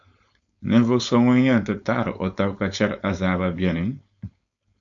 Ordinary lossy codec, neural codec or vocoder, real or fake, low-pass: AAC, 64 kbps; codec, 16 kHz, 4.8 kbps, FACodec; fake; 7.2 kHz